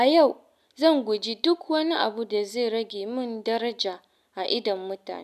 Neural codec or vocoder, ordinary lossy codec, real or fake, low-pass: none; none; real; 14.4 kHz